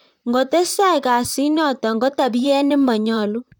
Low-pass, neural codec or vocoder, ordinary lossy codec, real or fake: 19.8 kHz; vocoder, 44.1 kHz, 128 mel bands, Pupu-Vocoder; none; fake